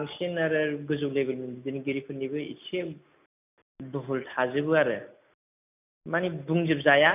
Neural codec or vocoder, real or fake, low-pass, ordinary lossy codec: none; real; 3.6 kHz; none